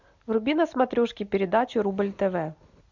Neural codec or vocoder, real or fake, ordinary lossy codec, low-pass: none; real; MP3, 64 kbps; 7.2 kHz